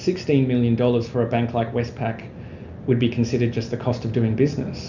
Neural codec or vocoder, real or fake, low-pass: none; real; 7.2 kHz